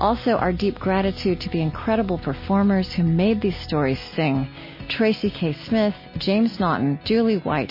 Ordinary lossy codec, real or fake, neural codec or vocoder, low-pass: MP3, 24 kbps; real; none; 5.4 kHz